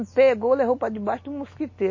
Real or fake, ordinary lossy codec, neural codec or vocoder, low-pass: real; MP3, 32 kbps; none; 7.2 kHz